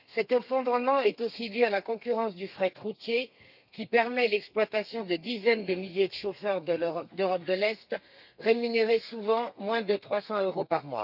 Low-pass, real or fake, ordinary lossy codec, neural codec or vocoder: 5.4 kHz; fake; none; codec, 32 kHz, 1.9 kbps, SNAC